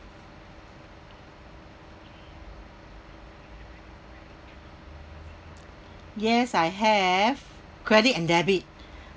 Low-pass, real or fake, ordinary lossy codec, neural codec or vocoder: none; real; none; none